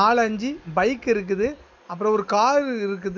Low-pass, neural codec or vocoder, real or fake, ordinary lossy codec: none; none; real; none